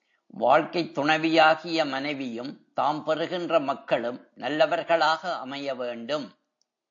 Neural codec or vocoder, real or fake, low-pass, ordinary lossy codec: none; real; 7.2 kHz; AAC, 48 kbps